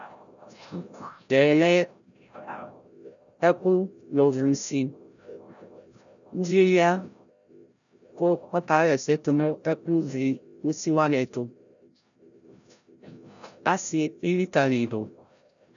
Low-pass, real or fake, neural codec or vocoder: 7.2 kHz; fake; codec, 16 kHz, 0.5 kbps, FreqCodec, larger model